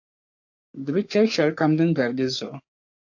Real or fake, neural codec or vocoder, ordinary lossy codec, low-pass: fake; codec, 44.1 kHz, 3.4 kbps, Pupu-Codec; AAC, 48 kbps; 7.2 kHz